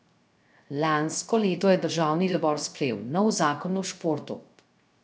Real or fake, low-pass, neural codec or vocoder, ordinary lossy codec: fake; none; codec, 16 kHz, 0.3 kbps, FocalCodec; none